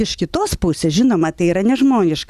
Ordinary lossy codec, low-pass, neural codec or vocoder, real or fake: Opus, 64 kbps; 14.4 kHz; none; real